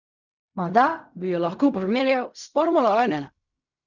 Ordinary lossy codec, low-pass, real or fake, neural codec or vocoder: none; 7.2 kHz; fake; codec, 16 kHz in and 24 kHz out, 0.4 kbps, LongCat-Audio-Codec, fine tuned four codebook decoder